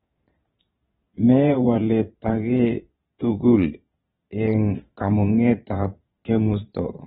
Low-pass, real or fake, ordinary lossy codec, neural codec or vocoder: 9.9 kHz; fake; AAC, 16 kbps; vocoder, 22.05 kHz, 80 mel bands, Vocos